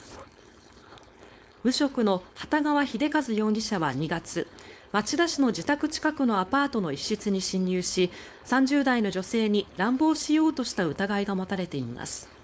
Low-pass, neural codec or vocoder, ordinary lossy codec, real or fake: none; codec, 16 kHz, 4.8 kbps, FACodec; none; fake